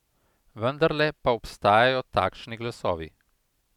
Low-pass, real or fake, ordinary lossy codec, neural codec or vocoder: 19.8 kHz; real; none; none